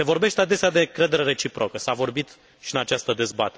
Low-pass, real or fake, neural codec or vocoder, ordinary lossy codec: none; real; none; none